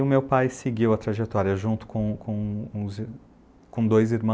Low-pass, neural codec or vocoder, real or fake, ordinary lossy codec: none; none; real; none